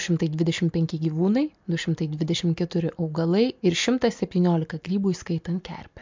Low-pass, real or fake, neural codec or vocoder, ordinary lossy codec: 7.2 kHz; real; none; MP3, 64 kbps